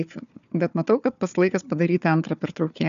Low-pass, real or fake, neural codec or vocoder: 7.2 kHz; fake; codec, 16 kHz, 4 kbps, FunCodec, trained on Chinese and English, 50 frames a second